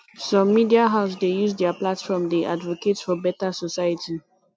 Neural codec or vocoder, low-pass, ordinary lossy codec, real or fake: none; none; none; real